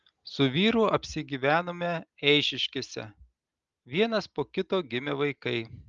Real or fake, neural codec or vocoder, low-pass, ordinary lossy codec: real; none; 7.2 kHz; Opus, 32 kbps